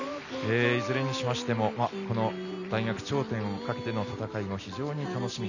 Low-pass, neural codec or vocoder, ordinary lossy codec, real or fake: 7.2 kHz; none; none; real